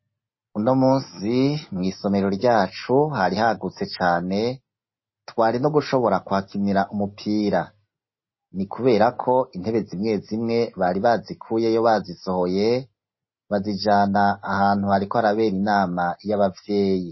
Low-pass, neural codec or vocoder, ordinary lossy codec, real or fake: 7.2 kHz; none; MP3, 24 kbps; real